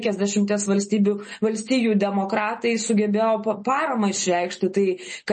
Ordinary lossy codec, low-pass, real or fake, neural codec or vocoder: MP3, 32 kbps; 10.8 kHz; real; none